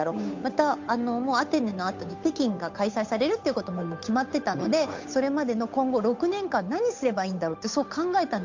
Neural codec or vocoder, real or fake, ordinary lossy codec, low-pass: codec, 16 kHz, 8 kbps, FunCodec, trained on Chinese and English, 25 frames a second; fake; MP3, 48 kbps; 7.2 kHz